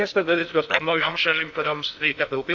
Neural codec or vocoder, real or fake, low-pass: codec, 16 kHz in and 24 kHz out, 0.6 kbps, FocalCodec, streaming, 2048 codes; fake; 7.2 kHz